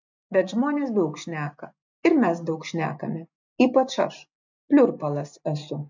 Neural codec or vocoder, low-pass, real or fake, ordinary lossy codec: none; 7.2 kHz; real; MP3, 64 kbps